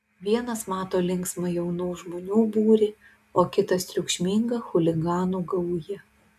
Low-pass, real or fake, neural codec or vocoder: 14.4 kHz; real; none